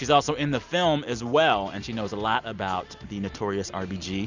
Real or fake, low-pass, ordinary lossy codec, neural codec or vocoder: real; 7.2 kHz; Opus, 64 kbps; none